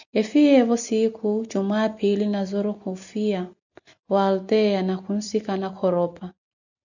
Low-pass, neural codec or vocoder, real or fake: 7.2 kHz; none; real